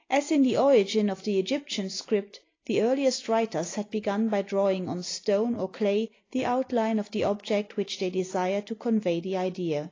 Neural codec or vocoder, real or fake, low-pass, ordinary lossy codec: none; real; 7.2 kHz; AAC, 32 kbps